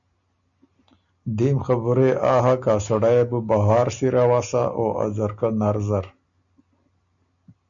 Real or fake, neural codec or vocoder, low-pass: real; none; 7.2 kHz